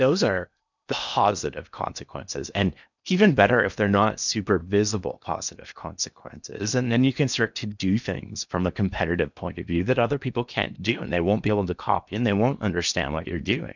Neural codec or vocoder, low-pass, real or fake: codec, 16 kHz in and 24 kHz out, 0.8 kbps, FocalCodec, streaming, 65536 codes; 7.2 kHz; fake